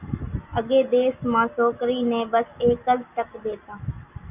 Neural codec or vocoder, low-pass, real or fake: none; 3.6 kHz; real